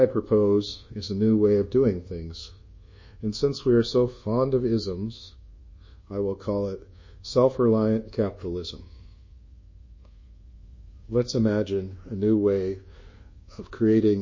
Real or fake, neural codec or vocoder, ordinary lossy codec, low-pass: fake; codec, 24 kHz, 1.2 kbps, DualCodec; MP3, 32 kbps; 7.2 kHz